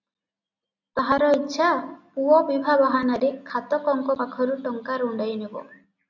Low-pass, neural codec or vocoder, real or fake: 7.2 kHz; none; real